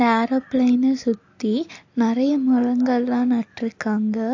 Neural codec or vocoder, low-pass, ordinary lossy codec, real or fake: none; 7.2 kHz; AAC, 48 kbps; real